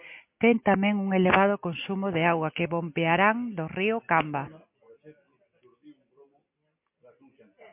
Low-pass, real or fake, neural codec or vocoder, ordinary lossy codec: 3.6 kHz; real; none; MP3, 32 kbps